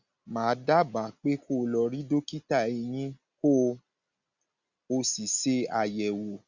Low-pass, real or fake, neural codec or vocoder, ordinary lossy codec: 7.2 kHz; real; none; Opus, 64 kbps